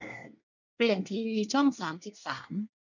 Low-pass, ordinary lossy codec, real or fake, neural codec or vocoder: 7.2 kHz; none; fake; codec, 24 kHz, 1 kbps, SNAC